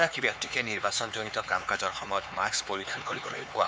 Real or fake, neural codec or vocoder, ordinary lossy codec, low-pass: fake; codec, 16 kHz, 4 kbps, X-Codec, HuBERT features, trained on LibriSpeech; none; none